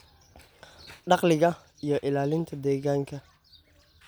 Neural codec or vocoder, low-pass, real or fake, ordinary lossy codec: none; none; real; none